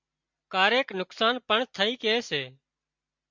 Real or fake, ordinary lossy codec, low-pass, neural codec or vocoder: real; MP3, 64 kbps; 7.2 kHz; none